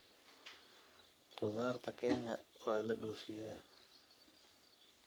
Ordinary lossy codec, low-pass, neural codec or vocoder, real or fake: none; none; codec, 44.1 kHz, 3.4 kbps, Pupu-Codec; fake